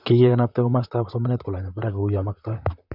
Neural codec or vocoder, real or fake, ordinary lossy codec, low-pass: vocoder, 44.1 kHz, 128 mel bands, Pupu-Vocoder; fake; none; 5.4 kHz